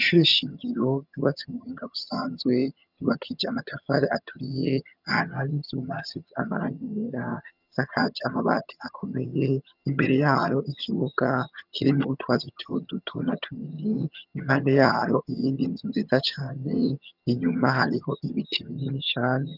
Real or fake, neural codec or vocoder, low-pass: fake; vocoder, 22.05 kHz, 80 mel bands, HiFi-GAN; 5.4 kHz